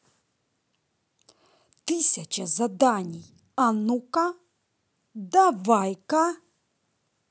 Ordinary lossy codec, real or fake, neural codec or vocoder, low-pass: none; real; none; none